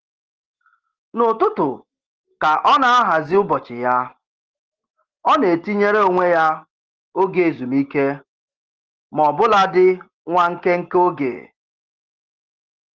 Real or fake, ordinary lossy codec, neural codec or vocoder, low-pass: real; Opus, 16 kbps; none; 7.2 kHz